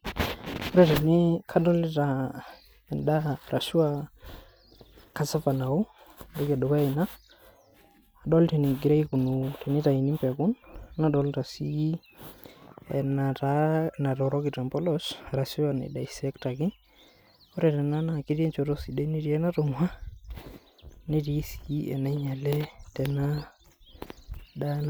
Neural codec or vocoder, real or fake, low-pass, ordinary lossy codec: none; real; none; none